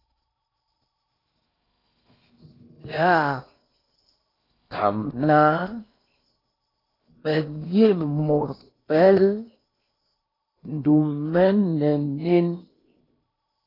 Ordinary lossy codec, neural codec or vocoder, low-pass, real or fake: AAC, 24 kbps; codec, 16 kHz in and 24 kHz out, 0.8 kbps, FocalCodec, streaming, 65536 codes; 5.4 kHz; fake